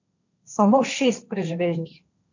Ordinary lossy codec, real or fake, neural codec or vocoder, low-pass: none; fake; codec, 16 kHz, 1.1 kbps, Voila-Tokenizer; 7.2 kHz